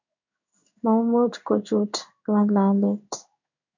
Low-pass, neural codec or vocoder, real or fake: 7.2 kHz; codec, 16 kHz in and 24 kHz out, 1 kbps, XY-Tokenizer; fake